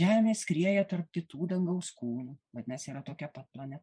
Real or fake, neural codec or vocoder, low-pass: fake; vocoder, 24 kHz, 100 mel bands, Vocos; 9.9 kHz